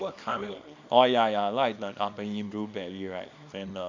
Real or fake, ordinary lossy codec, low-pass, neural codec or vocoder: fake; MP3, 64 kbps; 7.2 kHz; codec, 24 kHz, 0.9 kbps, WavTokenizer, small release